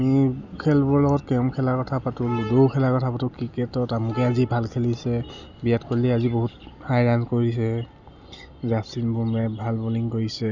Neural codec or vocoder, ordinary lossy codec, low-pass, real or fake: none; none; 7.2 kHz; real